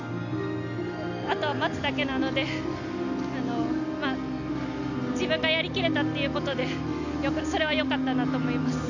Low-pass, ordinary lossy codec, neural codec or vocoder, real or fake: 7.2 kHz; none; none; real